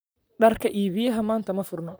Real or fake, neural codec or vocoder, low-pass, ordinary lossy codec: fake; vocoder, 44.1 kHz, 128 mel bands, Pupu-Vocoder; none; none